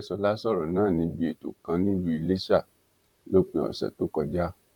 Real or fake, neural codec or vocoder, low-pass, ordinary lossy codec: fake; vocoder, 44.1 kHz, 128 mel bands, Pupu-Vocoder; 19.8 kHz; none